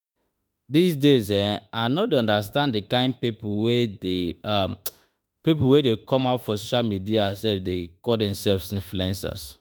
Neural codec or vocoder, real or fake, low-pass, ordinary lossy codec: autoencoder, 48 kHz, 32 numbers a frame, DAC-VAE, trained on Japanese speech; fake; none; none